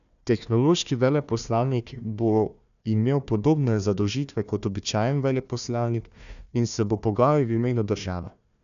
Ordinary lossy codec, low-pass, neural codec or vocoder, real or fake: none; 7.2 kHz; codec, 16 kHz, 1 kbps, FunCodec, trained on Chinese and English, 50 frames a second; fake